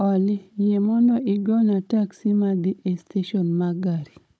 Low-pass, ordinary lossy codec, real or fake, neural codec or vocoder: none; none; fake; codec, 16 kHz, 16 kbps, FunCodec, trained on Chinese and English, 50 frames a second